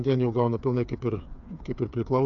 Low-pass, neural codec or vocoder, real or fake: 7.2 kHz; codec, 16 kHz, 8 kbps, FreqCodec, smaller model; fake